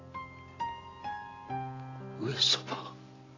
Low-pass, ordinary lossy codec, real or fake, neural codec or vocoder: 7.2 kHz; AAC, 32 kbps; real; none